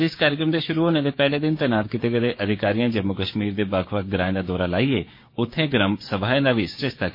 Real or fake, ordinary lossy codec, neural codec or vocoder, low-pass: fake; MP3, 32 kbps; codec, 16 kHz, 6 kbps, DAC; 5.4 kHz